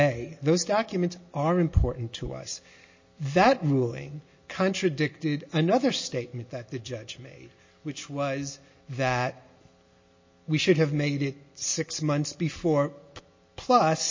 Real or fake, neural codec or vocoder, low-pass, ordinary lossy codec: real; none; 7.2 kHz; MP3, 32 kbps